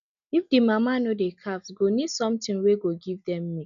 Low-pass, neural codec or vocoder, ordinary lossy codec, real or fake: 7.2 kHz; none; none; real